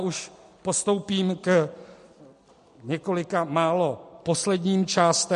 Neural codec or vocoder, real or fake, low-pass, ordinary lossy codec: none; real; 14.4 kHz; MP3, 48 kbps